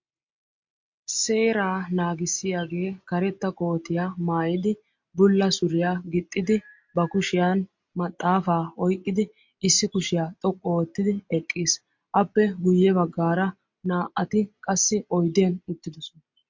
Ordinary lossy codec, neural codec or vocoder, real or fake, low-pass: MP3, 48 kbps; none; real; 7.2 kHz